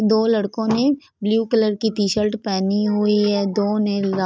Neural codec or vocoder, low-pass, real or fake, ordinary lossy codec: none; none; real; none